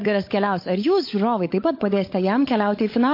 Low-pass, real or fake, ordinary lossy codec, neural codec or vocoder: 5.4 kHz; fake; MP3, 32 kbps; codec, 16 kHz, 16 kbps, FunCodec, trained on LibriTTS, 50 frames a second